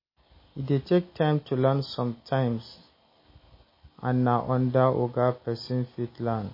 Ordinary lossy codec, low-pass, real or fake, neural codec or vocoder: MP3, 24 kbps; 5.4 kHz; real; none